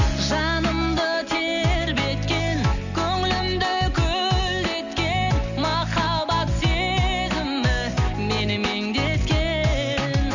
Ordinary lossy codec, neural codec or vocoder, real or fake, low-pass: none; none; real; 7.2 kHz